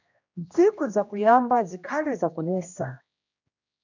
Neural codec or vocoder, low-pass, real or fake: codec, 16 kHz, 1 kbps, X-Codec, HuBERT features, trained on general audio; 7.2 kHz; fake